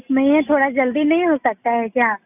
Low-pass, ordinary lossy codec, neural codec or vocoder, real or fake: 3.6 kHz; none; none; real